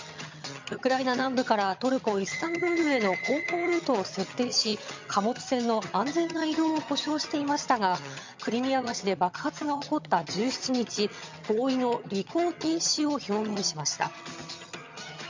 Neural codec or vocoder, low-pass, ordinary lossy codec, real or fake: vocoder, 22.05 kHz, 80 mel bands, HiFi-GAN; 7.2 kHz; MP3, 64 kbps; fake